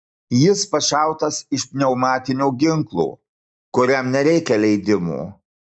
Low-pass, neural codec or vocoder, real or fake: 9.9 kHz; none; real